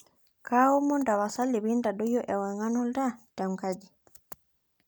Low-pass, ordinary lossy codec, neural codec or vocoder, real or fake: none; none; none; real